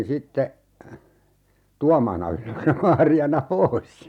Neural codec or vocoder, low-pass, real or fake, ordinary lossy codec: none; 19.8 kHz; real; none